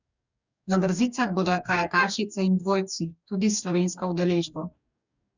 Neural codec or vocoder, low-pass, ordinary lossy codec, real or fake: codec, 44.1 kHz, 2.6 kbps, DAC; 7.2 kHz; none; fake